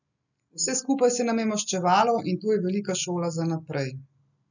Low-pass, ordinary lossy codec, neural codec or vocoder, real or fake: 7.2 kHz; none; none; real